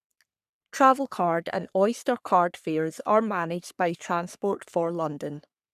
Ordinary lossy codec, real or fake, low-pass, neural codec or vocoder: none; fake; 14.4 kHz; codec, 44.1 kHz, 3.4 kbps, Pupu-Codec